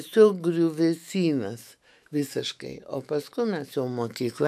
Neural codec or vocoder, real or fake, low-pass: autoencoder, 48 kHz, 128 numbers a frame, DAC-VAE, trained on Japanese speech; fake; 14.4 kHz